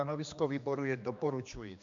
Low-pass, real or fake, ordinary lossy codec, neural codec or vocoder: 7.2 kHz; fake; MP3, 64 kbps; codec, 16 kHz, 2 kbps, X-Codec, HuBERT features, trained on general audio